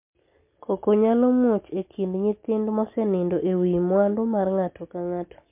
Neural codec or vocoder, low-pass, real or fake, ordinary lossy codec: none; 3.6 kHz; real; MP3, 32 kbps